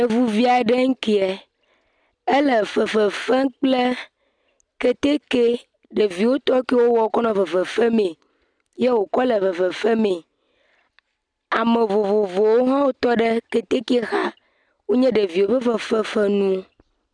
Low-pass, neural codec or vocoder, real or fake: 9.9 kHz; none; real